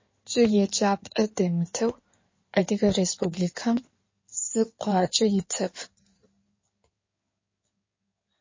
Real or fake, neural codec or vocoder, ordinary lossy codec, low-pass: fake; codec, 16 kHz in and 24 kHz out, 2.2 kbps, FireRedTTS-2 codec; MP3, 32 kbps; 7.2 kHz